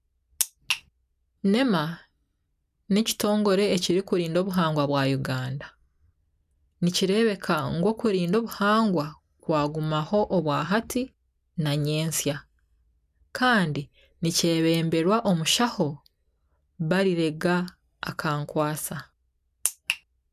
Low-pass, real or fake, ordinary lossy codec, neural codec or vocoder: 14.4 kHz; real; none; none